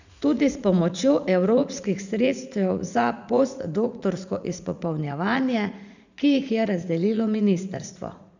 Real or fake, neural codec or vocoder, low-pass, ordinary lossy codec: fake; vocoder, 44.1 kHz, 80 mel bands, Vocos; 7.2 kHz; none